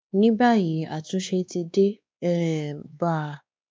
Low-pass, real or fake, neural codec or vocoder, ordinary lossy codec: none; fake; codec, 16 kHz, 2 kbps, X-Codec, WavLM features, trained on Multilingual LibriSpeech; none